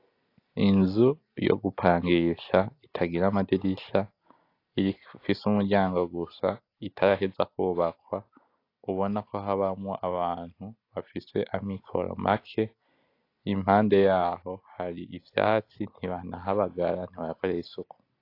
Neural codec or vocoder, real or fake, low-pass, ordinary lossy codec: none; real; 5.4 kHz; AAC, 32 kbps